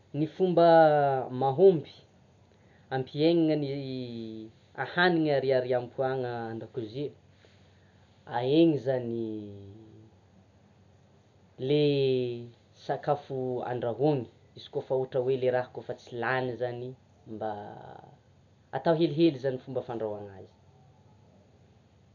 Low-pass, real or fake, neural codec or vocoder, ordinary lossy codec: 7.2 kHz; real; none; none